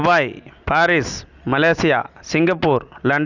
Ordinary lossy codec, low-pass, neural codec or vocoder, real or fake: none; 7.2 kHz; none; real